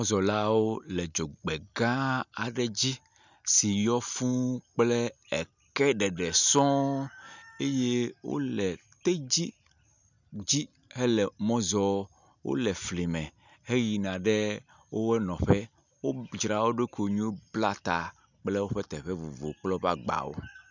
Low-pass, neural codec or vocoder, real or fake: 7.2 kHz; none; real